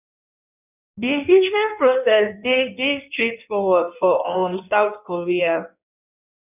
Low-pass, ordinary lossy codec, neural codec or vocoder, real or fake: 3.6 kHz; none; codec, 16 kHz in and 24 kHz out, 1.1 kbps, FireRedTTS-2 codec; fake